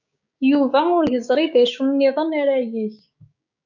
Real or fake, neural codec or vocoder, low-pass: fake; codec, 16 kHz, 6 kbps, DAC; 7.2 kHz